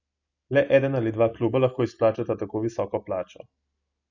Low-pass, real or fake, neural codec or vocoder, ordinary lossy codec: 7.2 kHz; real; none; none